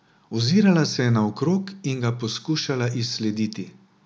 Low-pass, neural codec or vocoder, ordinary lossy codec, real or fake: none; none; none; real